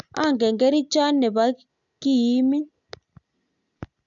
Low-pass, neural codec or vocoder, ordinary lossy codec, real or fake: 7.2 kHz; none; none; real